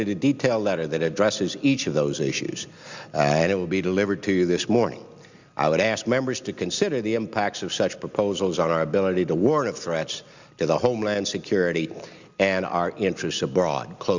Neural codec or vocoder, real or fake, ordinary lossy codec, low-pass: none; real; Opus, 64 kbps; 7.2 kHz